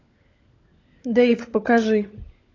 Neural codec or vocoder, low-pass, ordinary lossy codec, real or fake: codec, 16 kHz, 16 kbps, FunCodec, trained on LibriTTS, 50 frames a second; 7.2 kHz; AAC, 32 kbps; fake